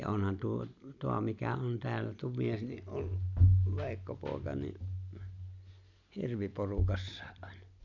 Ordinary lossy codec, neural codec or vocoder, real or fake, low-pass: none; none; real; 7.2 kHz